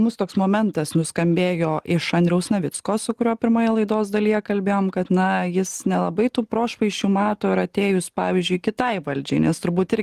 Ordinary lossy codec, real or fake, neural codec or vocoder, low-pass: Opus, 24 kbps; real; none; 14.4 kHz